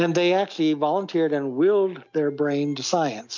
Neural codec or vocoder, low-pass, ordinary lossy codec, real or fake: none; 7.2 kHz; MP3, 48 kbps; real